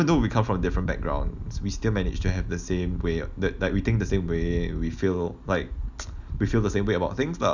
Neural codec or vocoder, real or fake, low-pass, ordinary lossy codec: none; real; 7.2 kHz; none